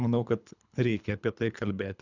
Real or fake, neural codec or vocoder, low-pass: fake; codec, 24 kHz, 3 kbps, HILCodec; 7.2 kHz